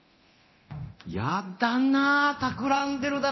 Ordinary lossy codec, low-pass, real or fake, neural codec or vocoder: MP3, 24 kbps; 7.2 kHz; fake; codec, 24 kHz, 0.9 kbps, DualCodec